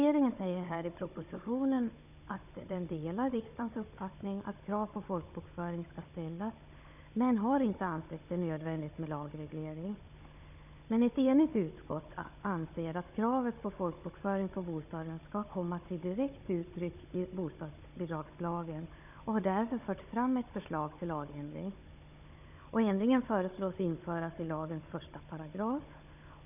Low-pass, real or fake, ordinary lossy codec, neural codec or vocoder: 3.6 kHz; fake; none; codec, 16 kHz, 16 kbps, FunCodec, trained on Chinese and English, 50 frames a second